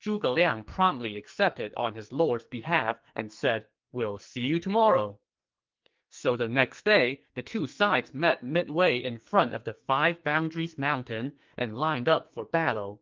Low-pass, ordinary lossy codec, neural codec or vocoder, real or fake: 7.2 kHz; Opus, 32 kbps; codec, 44.1 kHz, 2.6 kbps, SNAC; fake